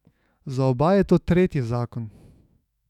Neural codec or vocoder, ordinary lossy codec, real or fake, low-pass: autoencoder, 48 kHz, 128 numbers a frame, DAC-VAE, trained on Japanese speech; none; fake; 19.8 kHz